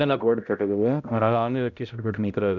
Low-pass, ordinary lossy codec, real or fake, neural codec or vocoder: 7.2 kHz; none; fake; codec, 16 kHz, 0.5 kbps, X-Codec, HuBERT features, trained on balanced general audio